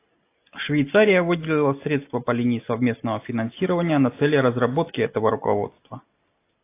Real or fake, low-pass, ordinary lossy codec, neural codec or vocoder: real; 3.6 kHz; AAC, 24 kbps; none